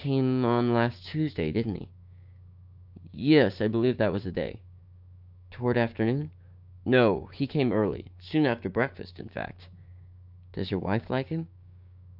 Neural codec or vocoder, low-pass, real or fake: codec, 16 kHz, 6 kbps, DAC; 5.4 kHz; fake